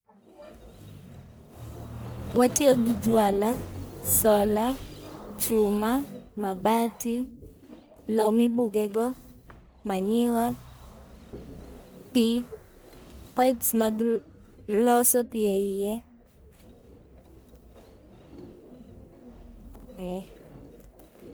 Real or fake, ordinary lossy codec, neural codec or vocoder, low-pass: fake; none; codec, 44.1 kHz, 1.7 kbps, Pupu-Codec; none